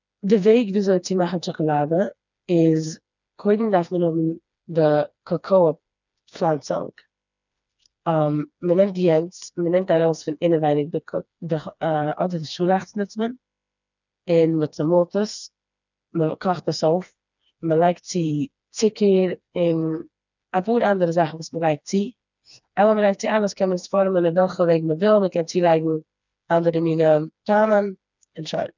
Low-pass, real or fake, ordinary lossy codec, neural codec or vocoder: 7.2 kHz; fake; none; codec, 16 kHz, 2 kbps, FreqCodec, smaller model